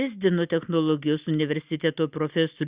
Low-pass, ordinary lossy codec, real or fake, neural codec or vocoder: 3.6 kHz; Opus, 64 kbps; real; none